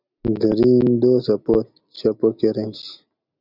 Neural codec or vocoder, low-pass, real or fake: none; 5.4 kHz; real